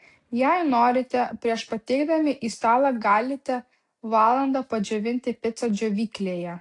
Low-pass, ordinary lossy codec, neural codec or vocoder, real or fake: 10.8 kHz; AAC, 48 kbps; none; real